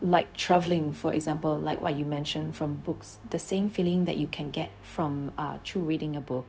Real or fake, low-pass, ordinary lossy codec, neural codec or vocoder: fake; none; none; codec, 16 kHz, 0.4 kbps, LongCat-Audio-Codec